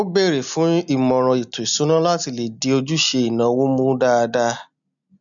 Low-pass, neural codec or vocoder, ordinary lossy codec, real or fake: 7.2 kHz; none; MP3, 96 kbps; real